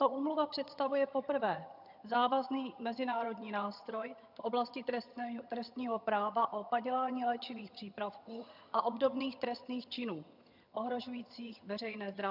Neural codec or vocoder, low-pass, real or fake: vocoder, 22.05 kHz, 80 mel bands, HiFi-GAN; 5.4 kHz; fake